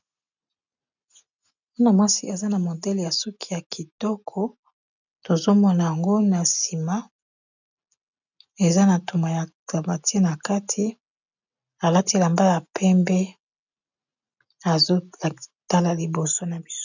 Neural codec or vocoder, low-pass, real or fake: none; 7.2 kHz; real